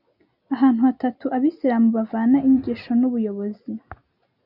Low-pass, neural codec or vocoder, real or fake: 5.4 kHz; none; real